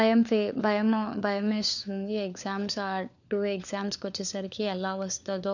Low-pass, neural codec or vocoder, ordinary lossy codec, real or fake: 7.2 kHz; codec, 16 kHz, 4 kbps, FunCodec, trained on LibriTTS, 50 frames a second; none; fake